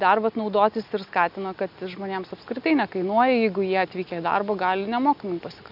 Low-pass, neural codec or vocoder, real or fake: 5.4 kHz; none; real